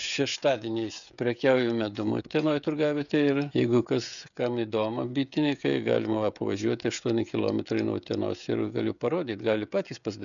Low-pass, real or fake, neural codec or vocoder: 7.2 kHz; fake; codec, 16 kHz, 16 kbps, FreqCodec, smaller model